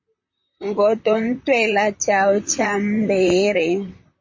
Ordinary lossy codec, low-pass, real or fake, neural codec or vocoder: MP3, 32 kbps; 7.2 kHz; fake; vocoder, 44.1 kHz, 128 mel bands, Pupu-Vocoder